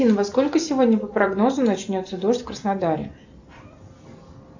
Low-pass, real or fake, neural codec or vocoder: 7.2 kHz; real; none